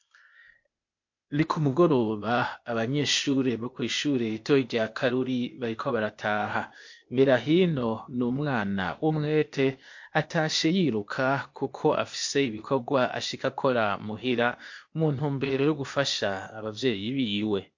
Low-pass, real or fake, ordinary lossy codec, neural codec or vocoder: 7.2 kHz; fake; MP3, 48 kbps; codec, 16 kHz, 0.8 kbps, ZipCodec